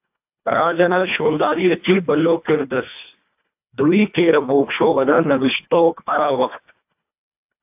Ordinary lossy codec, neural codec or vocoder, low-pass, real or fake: AAC, 32 kbps; codec, 24 kHz, 1.5 kbps, HILCodec; 3.6 kHz; fake